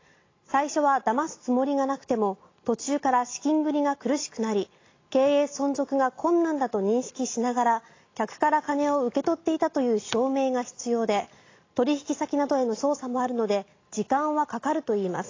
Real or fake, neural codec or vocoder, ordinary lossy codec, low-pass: real; none; AAC, 32 kbps; 7.2 kHz